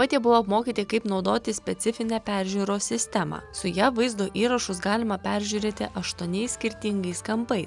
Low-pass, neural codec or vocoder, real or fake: 10.8 kHz; none; real